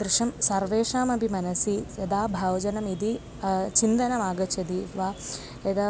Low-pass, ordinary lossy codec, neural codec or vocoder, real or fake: none; none; none; real